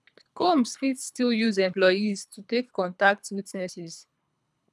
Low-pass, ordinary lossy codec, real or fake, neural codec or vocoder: none; none; fake; codec, 24 kHz, 3 kbps, HILCodec